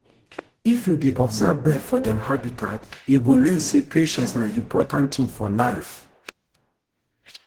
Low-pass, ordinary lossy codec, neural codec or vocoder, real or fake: 19.8 kHz; Opus, 16 kbps; codec, 44.1 kHz, 0.9 kbps, DAC; fake